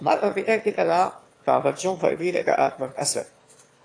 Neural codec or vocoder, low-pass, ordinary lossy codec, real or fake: autoencoder, 22.05 kHz, a latent of 192 numbers a frame, VITS, trained on one speaker; 9.9 kHz; AAC, 48 kbps; fake